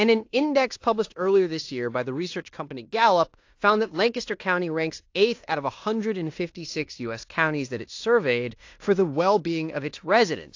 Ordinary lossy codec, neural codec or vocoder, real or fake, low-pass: AAC, 48 kbps; codec, 16 kHz in and 24 kHz out, 0.9 kbps, LongCat-Audio-Codec, fine tuned four codebook decoder; fake; 7.2 kHz